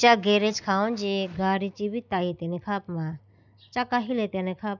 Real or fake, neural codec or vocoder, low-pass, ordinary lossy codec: real; none; 7.2 kHz; none